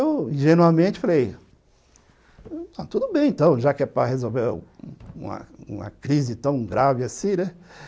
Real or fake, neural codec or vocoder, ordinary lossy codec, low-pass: real; none; none; none